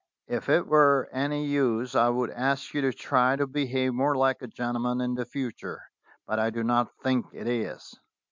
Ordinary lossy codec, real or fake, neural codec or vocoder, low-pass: MP3, 64 kbps; real; none; 7.2 kHz